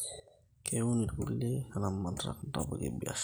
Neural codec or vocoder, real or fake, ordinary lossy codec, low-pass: none; real; none; none